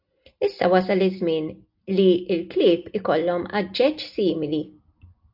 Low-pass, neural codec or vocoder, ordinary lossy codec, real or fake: 5.4 kHz; none; AAC, 48 kbps; real